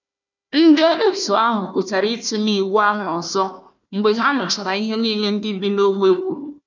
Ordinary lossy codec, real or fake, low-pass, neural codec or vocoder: none; fake; 7.2 kHz; codec, 16 kHz, 1 kbps, FunCodec, trained on Chinese and English, 50 frames a second